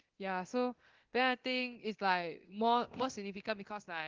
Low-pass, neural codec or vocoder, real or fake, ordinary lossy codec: 7.2 kHz; codec, 24 kHz, 0.9 kbps, DualCodec; fake; Opus, 16 kbps